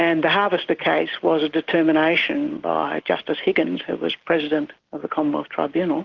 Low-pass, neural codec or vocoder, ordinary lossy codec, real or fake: 7.2 kHz; none; Opus, 16 kbps; real